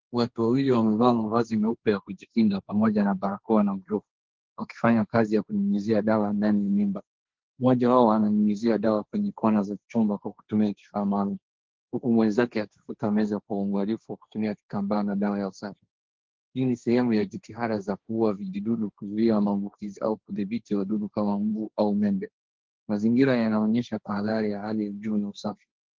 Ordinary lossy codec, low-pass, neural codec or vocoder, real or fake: Opus, 16 kbps; 7.2 kHz; codec, 16 kHz, 1.1 kbps, Voila-Tokenizer; fake